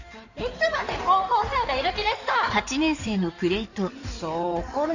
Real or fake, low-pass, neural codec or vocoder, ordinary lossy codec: fake; 7.2 kHz; codec, 16 kHz in and 24 kHz out, 2.2 kbps, FireRedTTS-2 codec; none